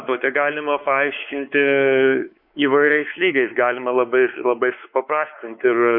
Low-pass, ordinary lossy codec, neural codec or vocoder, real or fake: 5.4 kHz; MP3, 48 kbps; codec, 16 kHz, 4 kbps, X-Codec, WavLM features, trained on Multilingual LibriSpeech; fake